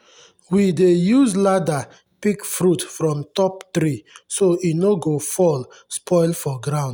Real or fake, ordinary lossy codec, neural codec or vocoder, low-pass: real; none; none; none